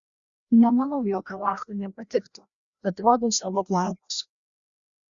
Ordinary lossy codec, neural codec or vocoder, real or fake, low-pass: Opus, 64 kbps; codec, 16 kHz, 1 kbps, FreqCodec, larger model; fake; 7.2 kHz